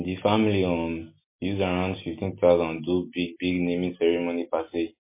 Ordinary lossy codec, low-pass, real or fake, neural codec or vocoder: MP3, 24 kbps; 3.6 kHz; real; none